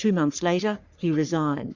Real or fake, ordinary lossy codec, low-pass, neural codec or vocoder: fake; Opus, 64 kbps; 7.2 kHz; codec, 44.1 kHz, 3.4 kbps, Pupu-Codec